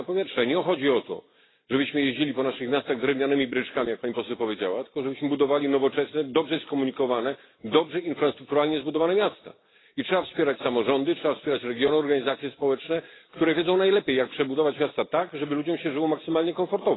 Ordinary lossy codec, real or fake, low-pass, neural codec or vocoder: AAC, 16 kbps; real; 7.2 kHz; none